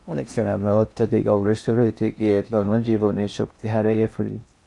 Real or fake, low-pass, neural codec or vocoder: fake; 10.8 kHz; codec, 16 kHz in and 24 kHz out, 0.6 kbps, FocalCodec, streaming, 4096 codes